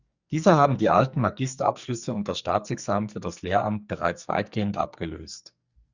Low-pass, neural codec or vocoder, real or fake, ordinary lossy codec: 7.2 kHz; codec, 44.1 kHz, 2.6 kbps, SNAC; fake; Opus, 64 kbps